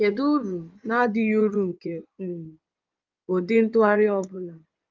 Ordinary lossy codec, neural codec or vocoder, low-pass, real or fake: Opus, 24 kbps; codec, 16 kHz in and 24 kHz out, 2.2 kbps, FireRedTTS-2 codec; 7.2 kHz; fake